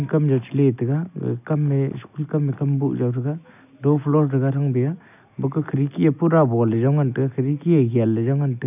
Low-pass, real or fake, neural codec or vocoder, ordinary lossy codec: 3.6 kHz; real; none; none